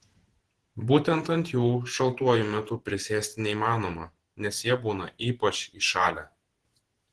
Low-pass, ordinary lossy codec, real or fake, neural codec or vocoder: 10.8 kHz; Opus, 16 kbps; fake; vocoder, 48 kHz, 128 mel bands, Vocos